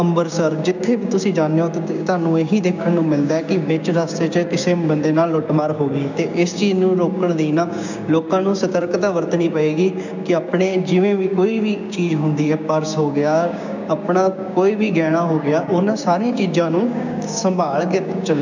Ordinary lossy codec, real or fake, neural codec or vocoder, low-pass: none; fake; codec, 16 kHz, 6 kbps, DAC; 7.2 kHz